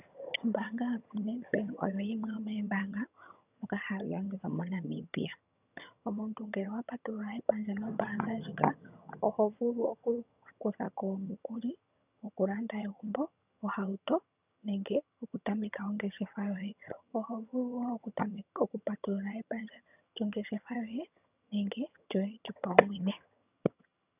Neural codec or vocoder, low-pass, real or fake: vocoder, 22.05 kHz, 80 mel bands, HiFi-GAN; 3.6 kHz; fake